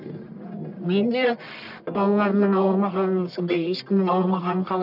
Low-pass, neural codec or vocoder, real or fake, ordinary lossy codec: 5.4 kHz; codec, 44.1 kHz, 1.7 kbps, Pupu-Codec; fake; none